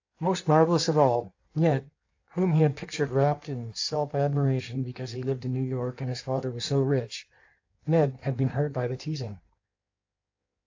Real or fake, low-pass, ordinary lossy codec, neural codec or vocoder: fake; 7.2 kHz; AAC, 48 kbps; codec, 16 kHz in and 24 kHz out, 1.1 kbps, FireRedTTS-2 codec